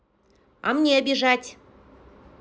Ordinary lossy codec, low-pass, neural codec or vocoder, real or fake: none; none; none; real